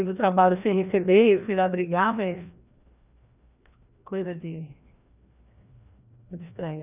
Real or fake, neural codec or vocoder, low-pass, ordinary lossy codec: fake; codec, 16 kHz, 1 kbps, FreqCodec, larger model; 3.6 kHz; none